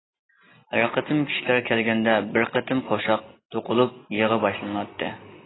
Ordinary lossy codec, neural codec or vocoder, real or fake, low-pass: AAC, 16 kbps; none; real; 7.2 kHz